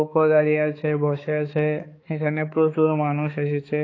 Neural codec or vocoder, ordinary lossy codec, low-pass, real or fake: codec, 16 kHz, 4 kbps, X-Codec, HuBERT features, trained on balanced general audio; AAC, 32 kbps; 7.2 kHz; fake